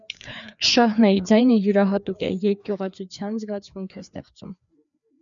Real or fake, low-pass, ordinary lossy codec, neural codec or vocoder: fake; 7.2 kHz; MP3, 96 kbps; codec, 16 kHz, 2 kbps, FreqCodec, larger model